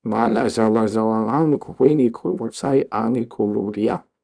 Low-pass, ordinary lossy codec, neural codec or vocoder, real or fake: 9.9 kHz; none; codec, 24 kHz, 0.9 kbps, WavTokenizer, small release; fake